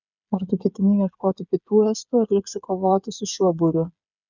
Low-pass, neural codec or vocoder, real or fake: 7.2 kHz; codec, 16 kHz, 8 kbps, FreqCodec, smaller model; fake